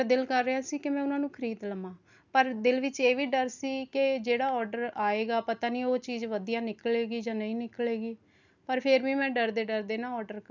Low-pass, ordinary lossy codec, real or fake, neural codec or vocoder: 7.2 kHz; none; real; none